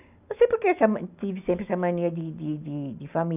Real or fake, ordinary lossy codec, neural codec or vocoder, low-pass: real; none; none; 3.6 kHz